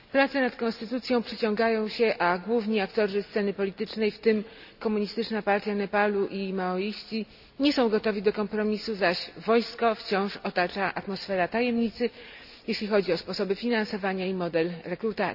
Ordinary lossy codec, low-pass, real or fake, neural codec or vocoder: none; 5.4 kHz; real; none